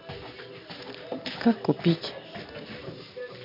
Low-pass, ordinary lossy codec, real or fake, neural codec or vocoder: 5.4 kHz; MP3, 48 kbps; real; none